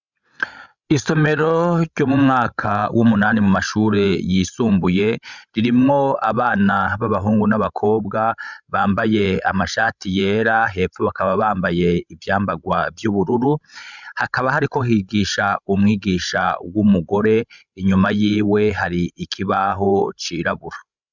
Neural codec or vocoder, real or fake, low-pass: codec, 16 kHz, 16 kbps, FreqCodec, larger model; fake; 7.2 kHz